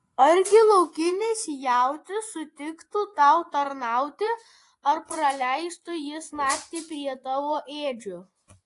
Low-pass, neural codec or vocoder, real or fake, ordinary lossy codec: 10.8 kHz; vocoder, 24 kHz, 100 mel bands, Vocos; fake; AAC, 48 kbps